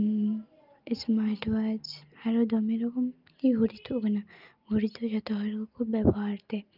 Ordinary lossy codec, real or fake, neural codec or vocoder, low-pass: Opus, 24 kbps; real; none; 5.4 kHz